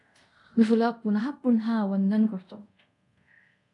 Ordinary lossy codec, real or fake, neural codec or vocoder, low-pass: AAC, 64 kbps; fake; codec, 24 kHz, 0.5 kbps, DualCodec; 10.8 kHz